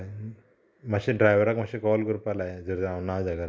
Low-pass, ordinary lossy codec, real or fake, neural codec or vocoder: none; none; real; none